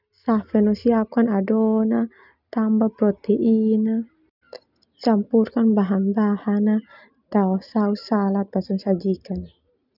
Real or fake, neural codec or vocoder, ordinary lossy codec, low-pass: real; none; none; 5.4 kHz